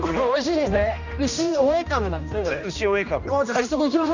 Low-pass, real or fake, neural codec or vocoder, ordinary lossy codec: 7.2 kHz; fake; codec, 16 kHz, 1 kbps, X-Codec, HuBERT features, trained on general audio; none